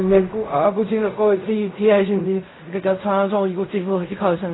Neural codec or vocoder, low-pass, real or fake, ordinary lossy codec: codec, 16 kHz in and 24 kHz out, 0.4 kbps, LongCat-Audio-Codec, fine tuned four codebook decoder; 7.2 kHz; fake; AAC, 16 kbps